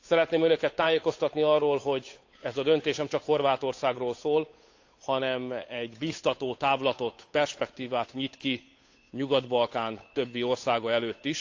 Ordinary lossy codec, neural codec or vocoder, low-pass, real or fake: AAC, 48 kbps; codec, 16 kHz, 8 kbps, FunCodec, trained on Chinese and English, 25 frames a second; 7.2 kHz; fake